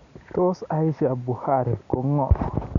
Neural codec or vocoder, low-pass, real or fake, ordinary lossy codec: none; 7.2 kHz; real; Opus, 64 kbps